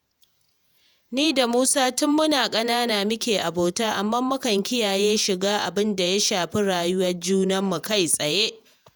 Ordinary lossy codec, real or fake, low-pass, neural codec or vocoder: none; fake; none; vocoder, 48 kHz, 128 mel bands, Vocos